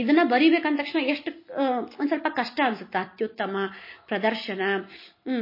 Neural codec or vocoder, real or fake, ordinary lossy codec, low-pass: none; real; MP3, 24 kbps; 5.4 kHz